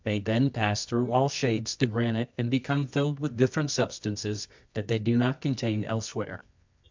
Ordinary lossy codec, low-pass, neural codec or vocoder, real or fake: MP3, 64 kbps; 7.2 kHz; codec, 24 kHz, 0.9 kbps, WavTokenizer, medium music audio release; fake